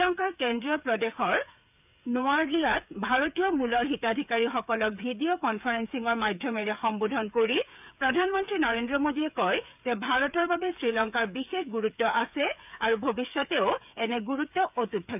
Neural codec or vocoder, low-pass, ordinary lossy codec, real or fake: codec, 16 kHz, 8 kbps, FreqCodec, smaller model; 3.6 kHz; none; fake